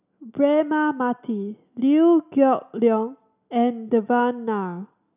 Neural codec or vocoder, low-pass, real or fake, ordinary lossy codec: none; 3.6 kHz; real; none